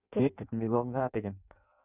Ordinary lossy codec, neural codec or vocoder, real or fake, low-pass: none; codec, 16 kHz in and 24 kHz out, 0.6 kbps, FireRedTTS-2 codec; fake; 3.6 kHz